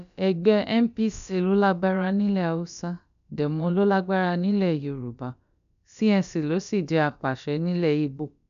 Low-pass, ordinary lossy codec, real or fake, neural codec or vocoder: 7.2 kHz; none; fake; codec, 16 kHz, about 1 kbps, DyCAST, with the encoder's durations